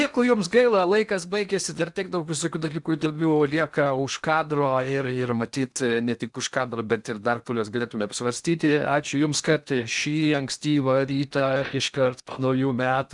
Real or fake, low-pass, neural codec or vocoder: fake; 10.8 kHz; codec, 16 kHz in and 24 kHz out, 0.8 kbps, FocalCodec, streaming, 65536 codes